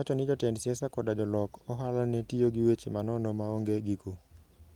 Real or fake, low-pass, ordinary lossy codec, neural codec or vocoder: real; 19.8 kHz; Opus, 24 kbps; none